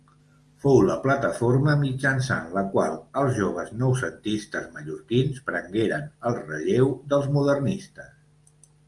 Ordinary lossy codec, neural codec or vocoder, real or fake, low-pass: Opus, 32 kbps; none; real; 10.8 kHz